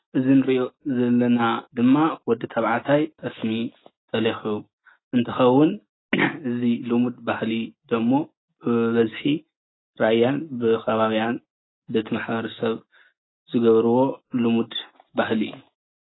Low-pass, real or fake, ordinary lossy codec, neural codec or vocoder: 7.2 kHz; real; AAC, 16 kbps; none